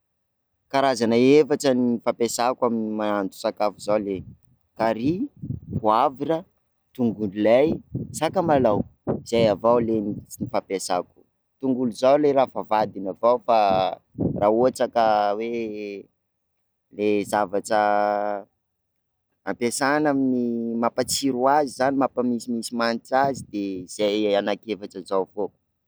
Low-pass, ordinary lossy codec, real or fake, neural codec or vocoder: none; none; real; none